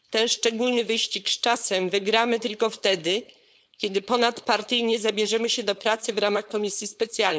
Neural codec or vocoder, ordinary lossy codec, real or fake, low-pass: codec, 16 kHz, 4.8 kbps, FACodec; none; fake; none